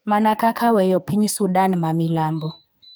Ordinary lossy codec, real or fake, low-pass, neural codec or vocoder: none; fake; none; codec, 44.1 kHz, 2.6 kbps, SNAC